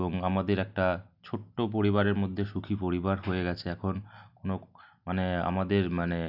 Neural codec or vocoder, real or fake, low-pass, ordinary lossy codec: none; real; 5.4 kHz; none